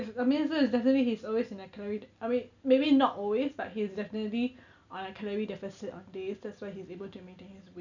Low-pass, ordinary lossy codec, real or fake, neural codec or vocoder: 7.2 kHz; none; real; none